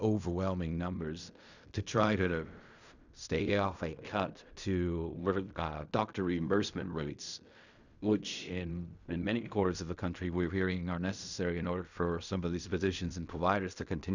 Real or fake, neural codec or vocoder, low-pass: fake; codec, 16 kHz in and 24 kHz out, 0.4 kbps, LongCat-Audio-Codec, fine tuned four codebook decoder; 7.2 kHz